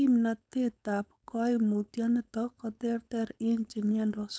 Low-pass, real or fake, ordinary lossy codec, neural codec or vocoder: none; fake; none; codec, 16 kHz, 4.8 kbps, FACodec